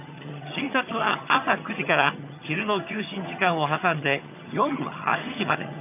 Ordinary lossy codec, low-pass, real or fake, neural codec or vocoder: none; 3.6 kHz; fake; vocoder, 22.05 kHz, 80 mel bands, HiFi-GAN